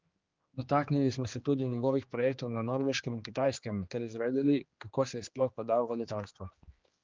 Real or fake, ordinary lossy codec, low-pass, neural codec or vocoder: fake; Opus, 32 kbps; 7.2 kHz; codec, 16 kHz, 2 kbps, X-Codec, HuBERT features, trained on general audio